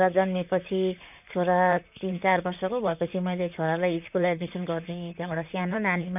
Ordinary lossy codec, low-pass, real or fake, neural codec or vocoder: none; 3.6 kHz; fake; vocoder, 44.1 kHz, 128 mel bands, Pupu-Vocoder